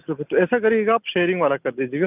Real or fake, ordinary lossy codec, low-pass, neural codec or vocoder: real; none; 3.6 kHz; none